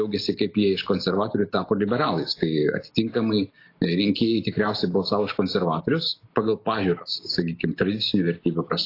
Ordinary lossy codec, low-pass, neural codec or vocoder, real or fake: AAC, 32 kbps; 5.4 kHz; none; real